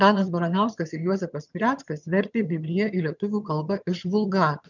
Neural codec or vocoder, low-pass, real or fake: vocoder, 22.05 kHz, 80 mel bands, HiFi-GAN; 7.2 kHz; fake